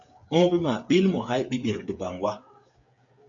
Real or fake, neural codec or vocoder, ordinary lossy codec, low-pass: fake; codec, 16 kHz, 8 kbps, FreqCodec, smaller model; MP3, 48 kbps; 7.2 kHz